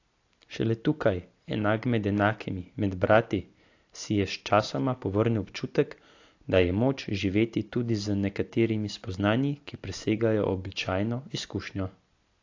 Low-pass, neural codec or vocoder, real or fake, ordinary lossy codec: 7.2 kHz; none; real; AAC, 48 kbps